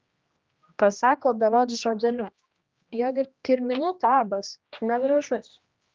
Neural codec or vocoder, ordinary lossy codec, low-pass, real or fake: codec, 16 kHz, 1 kbps, X-Codec, HuBERT features, trained on general audio; Opus, 24 kbps; 7.2 kHz; fake